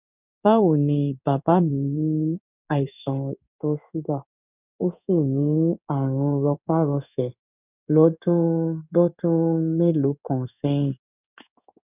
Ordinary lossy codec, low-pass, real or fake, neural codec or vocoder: none; 3.6 kHz; fake; codec, 16 kHz in and 24 kHz out, 1 kbps, XY-Tokenizer